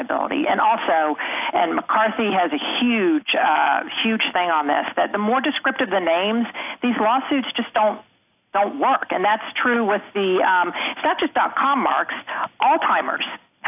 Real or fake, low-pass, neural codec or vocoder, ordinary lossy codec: real; 3.6 kHz; none; AAC, 32 kbps